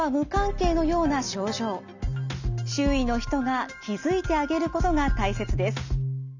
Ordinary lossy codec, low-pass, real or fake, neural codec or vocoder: none; 7.2 kHz; real; none